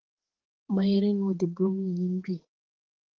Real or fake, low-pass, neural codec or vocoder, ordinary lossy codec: fake; 7.2 kHz; codec, 16 kHz, 4 kbps, X-Codec, HuBERT features, trained on balanced general audio; Opus, 16 kbps